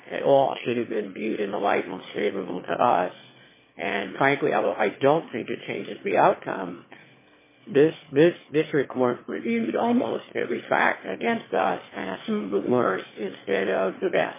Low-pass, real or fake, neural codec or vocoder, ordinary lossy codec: 3.6 kHz; fake; autoencoder, 22.05 kHz, a latent of 192 numbers a frame, VITS, trained on one speaker; MP3, 16 kbps